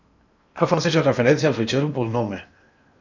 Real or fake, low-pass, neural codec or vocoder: fake; 7.2 kHz; codec, 16 kHz in and 24 kHz out, 0.8 kbps, FocalCodec, streaming, 65536 codes